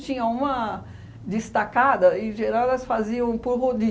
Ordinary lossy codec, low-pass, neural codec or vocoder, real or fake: none; none; none; real